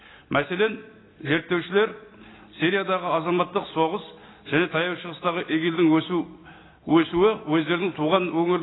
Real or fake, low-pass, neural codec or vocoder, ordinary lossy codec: real; 7.2 kHz; none; AAC, 16 kbps